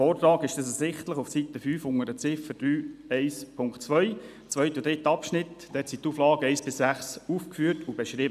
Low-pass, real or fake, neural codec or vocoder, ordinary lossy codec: 14.4 kHz; real; none; none